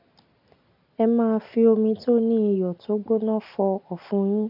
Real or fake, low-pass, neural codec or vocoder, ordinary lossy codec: real; 5.4 kHz; none; none